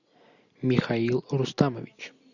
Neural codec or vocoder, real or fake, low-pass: none; real; 7.2 kHz